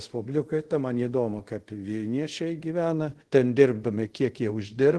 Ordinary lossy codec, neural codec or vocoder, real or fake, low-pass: Opus, 16 kbps; codec, 24 kHz, 0.5 kbps, DualCodec; fake; 10.8 kHz